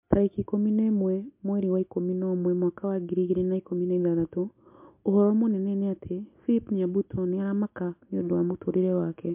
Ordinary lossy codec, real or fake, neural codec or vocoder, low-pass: MP3, 32 kbps; real; none; 3.6 kHz